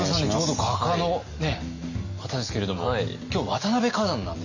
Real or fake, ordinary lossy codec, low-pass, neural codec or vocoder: real; none; 7.2 kHz; none